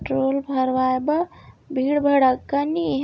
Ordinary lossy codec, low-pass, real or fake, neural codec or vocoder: none; none; real; none